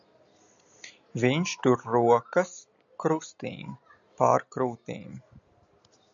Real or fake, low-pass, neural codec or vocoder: real; 7.2 kHz; none